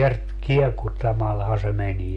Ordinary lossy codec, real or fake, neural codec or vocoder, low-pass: MP3, 48 kbps; real; none; 14.4 kHz